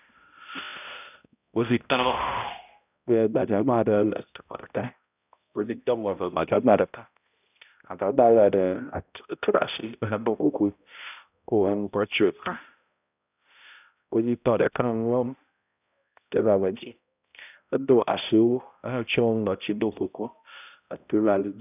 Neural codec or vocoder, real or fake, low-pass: codec, 16 kHz, 0.5 kbps, X-Codec, HuBERT features, trained on balanced general audio; fake; 3.6 kHz